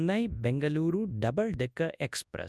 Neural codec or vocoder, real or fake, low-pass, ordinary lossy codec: codec, 24 kHz, 0.9 kbps, WavTokenizer, large speech release; fake; none; none